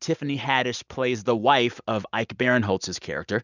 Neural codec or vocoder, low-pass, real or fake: none; 7.2 kHz; real